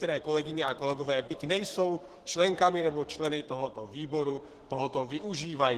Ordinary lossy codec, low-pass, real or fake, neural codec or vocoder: Opus, 16 kbps; 14.4 kHz; fake; codec, 32 kHz, 1.9 kbps, SNAC